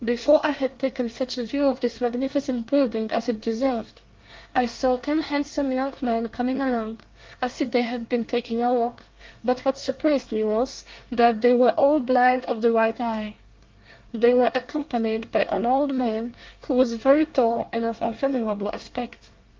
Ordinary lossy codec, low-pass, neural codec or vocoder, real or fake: Opus, 32 kbps; 7.2 kHz; codec, 24 kHz, 1 kbps, SNAC; fake